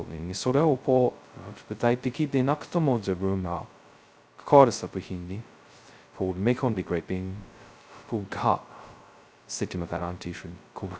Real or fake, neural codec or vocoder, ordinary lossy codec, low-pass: fake; codec, 16 kHz, 0.2 kbps, FocalCodec; none; none